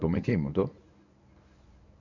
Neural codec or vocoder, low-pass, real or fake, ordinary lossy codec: codec, 24 kHz, 0.9 kbps, WavTokenizer, medium speech release version 1; 7.2 kHz; fake; none